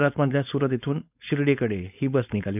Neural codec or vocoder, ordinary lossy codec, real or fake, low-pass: codec, 16 kHz, 4.8 kbps, FACodec; none; fake; 3.6 kHz